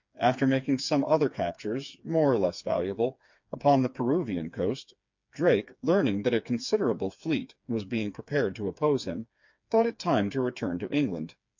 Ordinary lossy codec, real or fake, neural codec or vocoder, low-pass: MP3, 48 kbps; fake; codec, 16 kHz, 4 kbps, FreqCodec, smaller model; 7.2 kHz